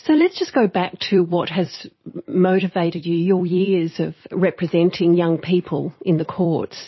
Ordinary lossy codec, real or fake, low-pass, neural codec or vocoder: MP3, 24 kbps; fake; 7.2 kHz; vocoder, 44.1 kHz, 128 mel bands every 256 samples, BigVGAN v2